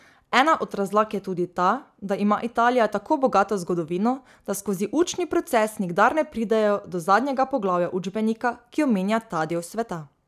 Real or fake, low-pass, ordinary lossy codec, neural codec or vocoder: real; 14.4 kHz; none; none